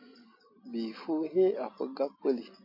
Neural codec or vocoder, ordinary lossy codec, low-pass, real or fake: none; MP3, 32 kbps; 5.4 kHz; real